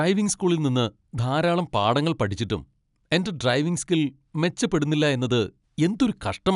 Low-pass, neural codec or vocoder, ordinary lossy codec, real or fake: 10.8 kHz; none; none; real